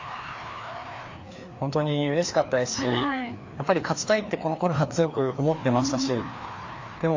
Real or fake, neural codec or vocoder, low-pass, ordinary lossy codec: fake; codec, 16 kHz, 2 kbps, FreqCodec, larger model; 7.2 kHz; AAC, 48 kbps